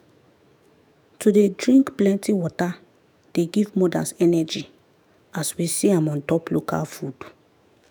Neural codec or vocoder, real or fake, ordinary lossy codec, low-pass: autoencoder, 48 kHz, 128 numbers a frame, DAC-VAE, trained on Japanese speech; fake; none; none